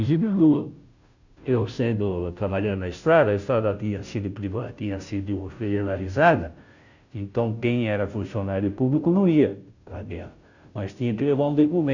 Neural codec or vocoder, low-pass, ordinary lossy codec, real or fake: codec, 16 kHz, 0.5 kbps, FunCodec, trained on Chinese and English, 25 frames a second; 7.2 kHz; none; fake